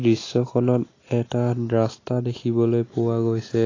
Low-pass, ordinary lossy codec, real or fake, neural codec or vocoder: 7.2 kHz; AAC, 32 kbps; real; none